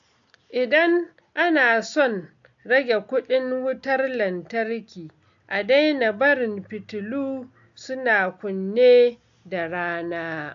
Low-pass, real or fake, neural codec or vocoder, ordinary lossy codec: 7.2 kHz; real; none; AAC, 64 kbps